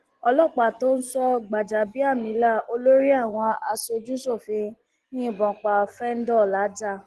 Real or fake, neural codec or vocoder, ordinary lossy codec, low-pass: real; none; Opus, 16 kbps; 10.8 kHz